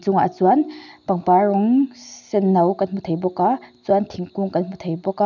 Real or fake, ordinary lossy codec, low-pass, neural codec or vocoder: real; none; 7.2 kHz; none